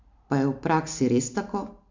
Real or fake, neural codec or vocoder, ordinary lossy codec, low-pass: real; none; MP3, 64 kbps; 7.2 kHz